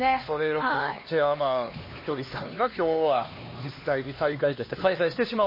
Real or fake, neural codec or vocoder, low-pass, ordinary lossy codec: fake; codec, 16 kHz, 2 kbps, X-Codec, HuBERT features, trained on LibriSpeech; 5.4 kHz; MP3, 24 kbps